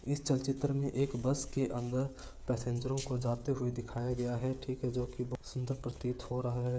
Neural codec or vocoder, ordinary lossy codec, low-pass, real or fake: codec, 16 kHz, 16 kbps, FreqCodec, smaller model; none; none; fake